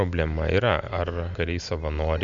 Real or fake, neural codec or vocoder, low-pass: real; none; 7.2 kHz